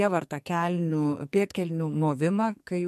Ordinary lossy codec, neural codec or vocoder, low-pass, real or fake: MP3, 64 kbps; codec, 44.1 kHz, 2.6 kbps, SNAC; 14.4 kHz; fake